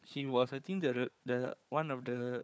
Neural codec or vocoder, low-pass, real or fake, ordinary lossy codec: codec, 16 kHz, 4 kbps, FunCodec, trained on Chinese and English, 50 frames a second; none; fake; none